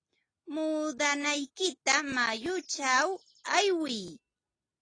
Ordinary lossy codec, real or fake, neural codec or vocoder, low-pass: AAC, 32 kbps; real; none; 9.9 kHz